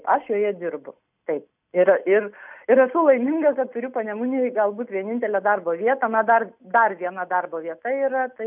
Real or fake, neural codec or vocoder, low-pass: real; none; 3.6 kHz